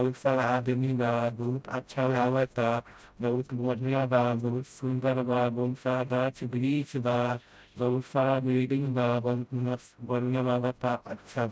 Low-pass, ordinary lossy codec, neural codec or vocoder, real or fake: none; none; codec, 16 kHz, 0.5 kbps, FreqCodec, smaller model; fake